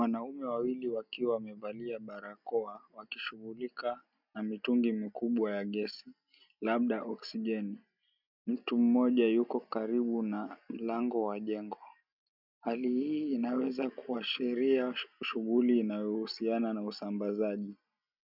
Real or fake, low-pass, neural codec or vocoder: real; 5.4 kHz; none